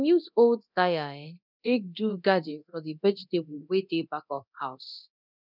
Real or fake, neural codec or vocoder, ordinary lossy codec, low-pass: fake; codec, 24 kHz, 0.9 kbps, DualCodec; none; 5.4 kHz